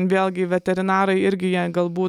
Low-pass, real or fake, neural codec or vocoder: 19.8 kHz; real; none